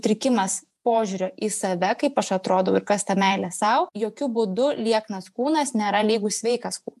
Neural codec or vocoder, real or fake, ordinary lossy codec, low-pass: vocoder, 48 kHz, 128 mel bands, Vocos; fake; MP3, 96 kbps; 14.4 kHz